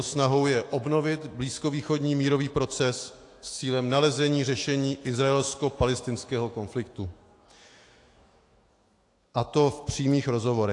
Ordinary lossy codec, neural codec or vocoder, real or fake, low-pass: AAC, 48 kbps; autoencoder, 48 kHz, 128 numbers a frame, DAC-VAE, trained on Japanese speech; fake; 10.8 kHz